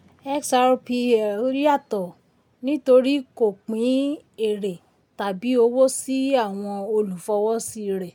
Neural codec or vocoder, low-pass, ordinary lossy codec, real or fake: none; 19.8 kHz; MP3, 96 kbps; real